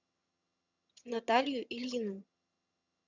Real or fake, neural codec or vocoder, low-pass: fake; vocoder, 22.05 kHz, 80 mel bands, HiFi-GAN; 7.2 kHz